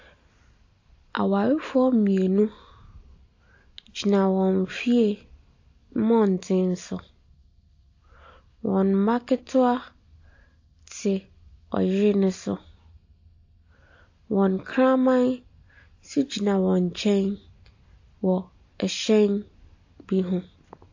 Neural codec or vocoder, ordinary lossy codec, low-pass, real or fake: none; AAC, 48 kbps; 7.2 kHz; real